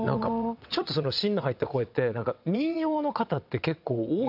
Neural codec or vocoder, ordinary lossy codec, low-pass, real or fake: vocoder, 22.05 kHz, 80 mel bands, WaveNeXt; none; 5.4 kHz; fake